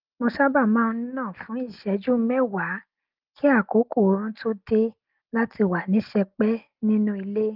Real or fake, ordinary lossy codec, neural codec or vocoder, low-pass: real; Opus, 32 kbps; none; 5.4 kHz